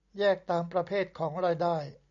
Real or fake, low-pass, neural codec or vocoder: real; 7.2 kHz; none